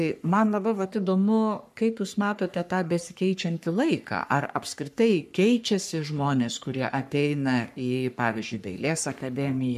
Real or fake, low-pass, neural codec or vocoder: fake; 14.4 kHz; codec, 44.1 kHz, 3.4 kbps, Pupu-Codec